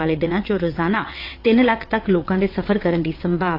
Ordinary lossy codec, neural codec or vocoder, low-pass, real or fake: AAC, 32 kbps; vocoder, 22.05 kHz, 80 mel bands, WaveNeXt; 5.4 kHz; fake